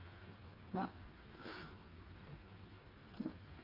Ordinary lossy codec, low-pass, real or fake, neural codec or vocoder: AAC, 24 kbps; 5.4 kHz; fake; codec, 16 kHz, 4 kbps, FreqCodec, smaller model